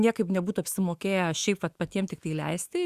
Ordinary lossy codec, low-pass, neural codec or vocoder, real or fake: Opus, 64 kbps; 14.4 kHz; autoencoder, 48 kHz, 128 numbers a frame, DAC-VAE, trained on Japanese speech; fake